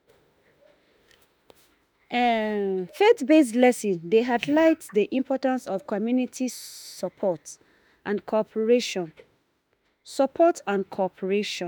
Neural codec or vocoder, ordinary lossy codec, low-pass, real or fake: autoencoder, 48 kHz, 32 numbers a frame, DAC-VAE, trained on Japanese speech; none; none; fake